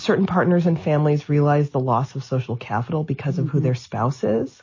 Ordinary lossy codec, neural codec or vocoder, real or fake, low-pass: MP3, 32 kbps; none; real; 7.2 kHz